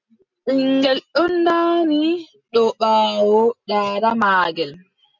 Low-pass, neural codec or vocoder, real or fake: 7.2 kHz; none; real